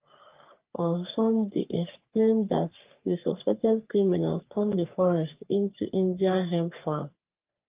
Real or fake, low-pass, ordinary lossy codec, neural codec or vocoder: fake; 3.6 kHz; Opus, 32 kbps; codec, 44.1 kHz, 3.4 kbps, Pupu-Codec